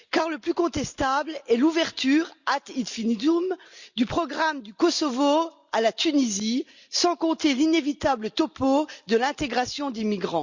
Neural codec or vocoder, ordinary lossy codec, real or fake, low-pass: none; Opus, 64 kbps; real; 7.2 kHz